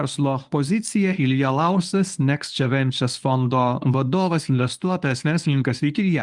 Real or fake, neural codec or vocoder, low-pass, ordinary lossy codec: fake; codec, 24 kHz, 0.9 kbps, WavTokenizer, medium speech release version 1; 10.8 kHz; Opus, 32 kbps